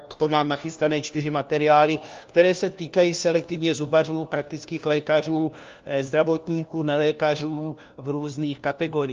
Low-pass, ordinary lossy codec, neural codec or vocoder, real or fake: 7.2 kHz; Opus, 32 kbps; codec, 16 kHz, 1 kbps, FunCodec, trained on LibriTTS, 50 frames a second; fake